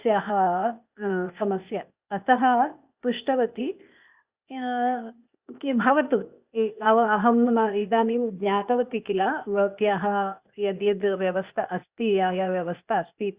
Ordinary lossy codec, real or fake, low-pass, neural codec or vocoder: Opus, 64 kbps; fake; 3.6 kHz; codec, 16 kHz, 0.8 kbps, ZipCodec